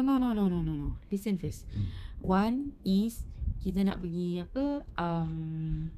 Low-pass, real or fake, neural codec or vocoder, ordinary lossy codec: 14.4 kHz; fake; codec, 32 kHz, 1.9 kbps, SNAC; none